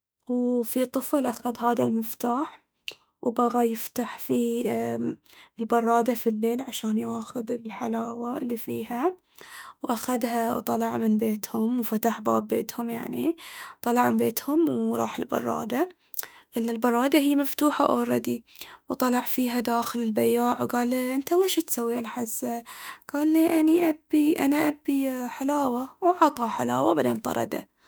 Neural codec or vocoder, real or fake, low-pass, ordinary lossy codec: autoencoder, 48 kHz, 32 numbers a frame, DAC-VAE, trained on Japanese speech; fake; none; none